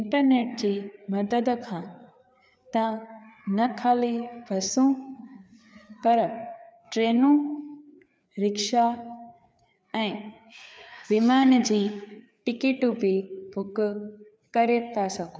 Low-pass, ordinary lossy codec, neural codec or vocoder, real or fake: none; none; codec, 16 kHz, 4 kbps, FreqCodec, larger model; fake